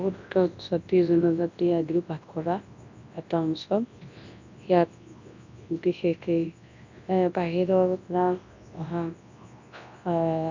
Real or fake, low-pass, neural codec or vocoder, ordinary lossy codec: fake; 7.2 kHz; codec, 24 kHz, 0.9 kbps, WavTokenizer, large speech release; AAC, 48 kbps